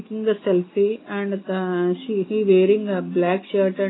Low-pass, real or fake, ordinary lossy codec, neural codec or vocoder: 7.2 kHz; real; AAC, 16 kbps; none